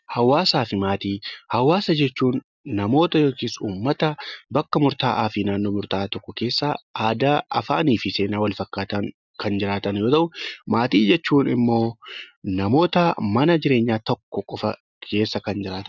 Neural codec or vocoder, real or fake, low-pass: none; real; 7.2 kHz